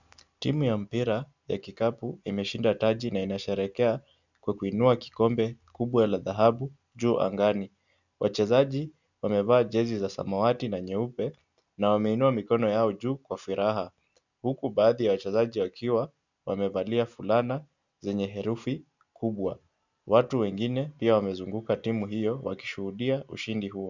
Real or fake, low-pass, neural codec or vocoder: real; 7.2 kHz; none